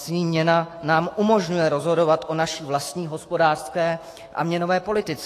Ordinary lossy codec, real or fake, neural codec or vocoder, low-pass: AAC, 48 kbps; fake; autoencoder, 48 kHz, 128 numbers a frame, DAC-VAE, trained on Japanese speech; 14.4 kHz